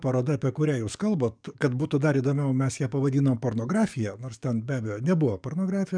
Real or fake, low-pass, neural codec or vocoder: fake; 9.9 kHz; codec, 44.1 kHz, 7.8 kbps, DAC